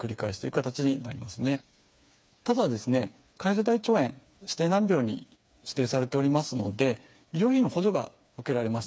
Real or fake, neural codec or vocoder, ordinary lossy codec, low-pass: fake; codec, 16 kHz, 4 kbps, FreqCodec, smaller model; none; none